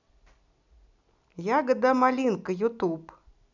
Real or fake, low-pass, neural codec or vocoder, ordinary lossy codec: real; 7.2 kHz; none; none